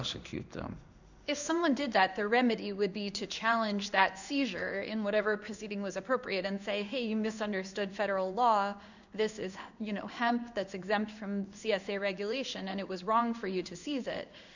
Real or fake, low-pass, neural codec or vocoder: fake; 7.2 kHz; codec, 16 kHz in and 24 kHz out, 1 kbps, XY-Tokenizer